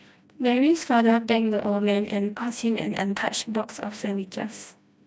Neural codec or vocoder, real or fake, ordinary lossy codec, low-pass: codec, 16 kHz, 1 kbps, FreqCodec, smaller model; fake; none; none